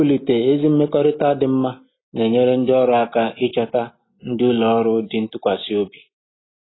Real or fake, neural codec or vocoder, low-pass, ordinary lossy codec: real; none; 7.2 kHz; AAC, 16 kbps